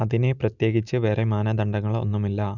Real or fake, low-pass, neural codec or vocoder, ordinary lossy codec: real; 7.2 kHz; none; none